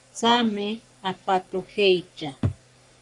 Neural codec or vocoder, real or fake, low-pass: codec, 44.1 kHz, 3.4 kbps, Pupu-Codec; fake; 10.8 kHz